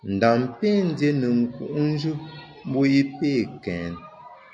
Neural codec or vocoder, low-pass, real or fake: none; 9.9 kHz; real